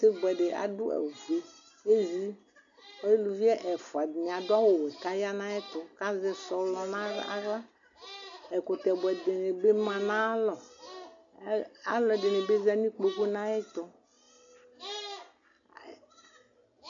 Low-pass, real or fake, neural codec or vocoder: 7.2 kHz; real; none